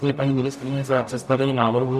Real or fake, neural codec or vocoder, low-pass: fake; codec, 44.1 kHz, 0.9 kbps, DAC; 14.4 kHz